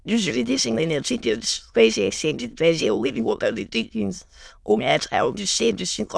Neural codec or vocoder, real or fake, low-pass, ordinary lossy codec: autoencoder, 22.05 kHz, a latent of 192 numbers a frame, VITS, trained on many speakers; fake; none; none